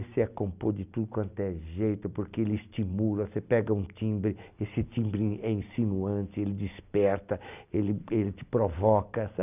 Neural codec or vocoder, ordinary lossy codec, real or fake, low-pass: none; none; real; 3.6 kHz